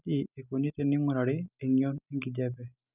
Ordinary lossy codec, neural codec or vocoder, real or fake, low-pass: none; none; real; 3.6 kHz